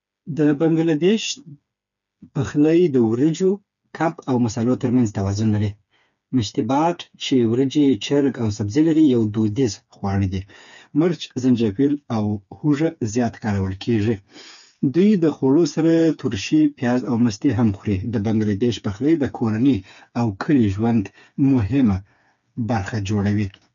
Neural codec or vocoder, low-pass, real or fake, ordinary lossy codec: codec, 16 kHz, 4 kbps, FreqCodec, smaller model; 7.2 kHz; fake; none